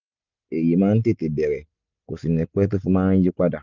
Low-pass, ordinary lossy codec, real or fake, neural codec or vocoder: 7.2 kHz; none; real; none